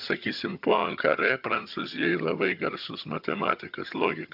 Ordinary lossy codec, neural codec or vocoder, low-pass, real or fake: AAC, 48 kbps; vocoder, 22.05 kHz, 80 mel bands, HiFi-GAN; 5.4 kHz; fake